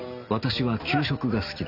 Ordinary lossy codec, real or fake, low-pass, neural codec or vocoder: none; real; 5.4 kHz; none